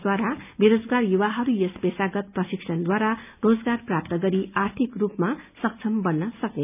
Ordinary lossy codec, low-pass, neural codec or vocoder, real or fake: none; 3.6 kHz; none; real